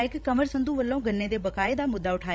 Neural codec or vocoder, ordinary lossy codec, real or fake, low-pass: codec, 16 kHz, 16 kbps, FreqCodec, larger model; none; fake; none